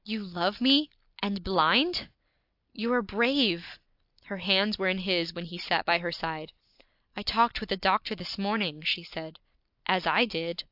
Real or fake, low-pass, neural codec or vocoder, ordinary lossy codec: real; 5.4 kHz; none; AAC, 48 kbps